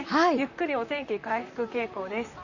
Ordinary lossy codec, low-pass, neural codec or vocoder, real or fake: none; 7.2 kHz; vocoder, 44.1 kHz, 128 mel bands, Pupu-Vocoder; fake